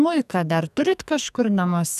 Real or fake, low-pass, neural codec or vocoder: fake; 14.4 kHz; codec, 44.1 kHz, 2.6 kbps, SNAC